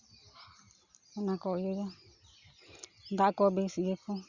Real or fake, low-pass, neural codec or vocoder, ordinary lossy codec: real; 7.2 kHz; none; Opus, 64 kbps